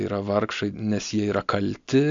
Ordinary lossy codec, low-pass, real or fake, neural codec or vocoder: AAC, 64 kbps; 7.2 kHz; real; none